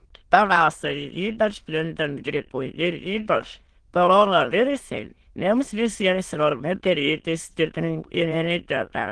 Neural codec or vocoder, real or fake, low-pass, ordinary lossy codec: autoencoder, 22.05 kHz, a latent of 192 numbers a frame, VITS, trained on many speakers; fake; 9.9 kHz; Opus, 16 kbps